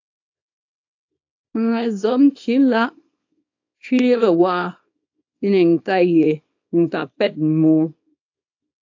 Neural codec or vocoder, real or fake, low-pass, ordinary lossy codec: codec, 24 kHz, 0.9 kbps, WavTokenizer, small release; fake; 7.2 kHz; AAC, 48 kbps